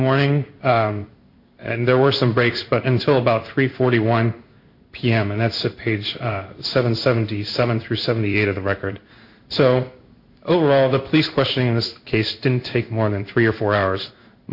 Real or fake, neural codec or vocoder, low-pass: fake; codec, 16 kHz in and 24 kHz out, 1 kbps, XY-Tokenizer; 5.4 kHz